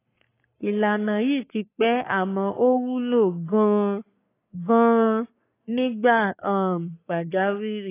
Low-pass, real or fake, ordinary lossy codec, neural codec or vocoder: 3.6 kHz; fake; AAC, 24 kbps; codec, 44.1 kHz, 3.4 kbps, Pupu-Codec